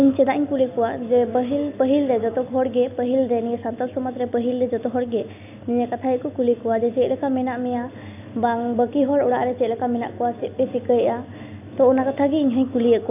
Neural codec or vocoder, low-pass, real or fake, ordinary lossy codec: none; 3.6 kHz; real; none